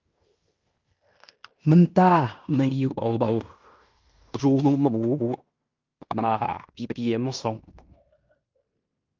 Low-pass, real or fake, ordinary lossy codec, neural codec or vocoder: 7.2 kHz; fake; Opus, 24 kbps; codec, 16 kHz in and 24 kHz out, 0.9 kbps, LongCat-Audio-Codec, fine tuned four codebook decoder